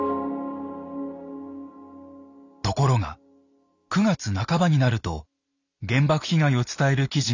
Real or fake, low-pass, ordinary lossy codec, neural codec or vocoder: real; 7.2 kHz; none; none